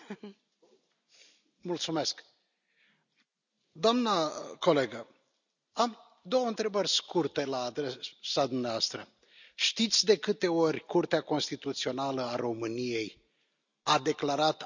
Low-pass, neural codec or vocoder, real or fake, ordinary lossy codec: 7.2 kHz; none; real; none